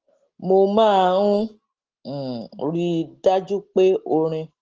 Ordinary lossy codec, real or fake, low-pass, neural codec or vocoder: Opus, 16 kbps; real; 7.2 kHz; none